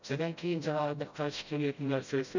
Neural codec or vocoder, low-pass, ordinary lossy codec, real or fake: codec, 16 kHz, 0.5 kbps, FreqCodec, smaller model; 7.2 kHz; none; fake